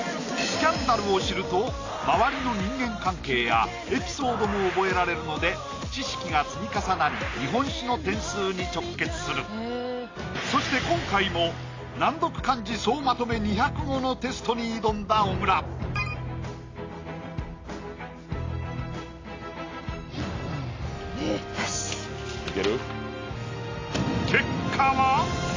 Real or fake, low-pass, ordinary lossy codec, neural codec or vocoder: real; 7.2 kHz; AAC, 32 kbps; none